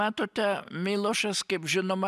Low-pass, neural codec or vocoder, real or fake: 14.4 kHz; none; real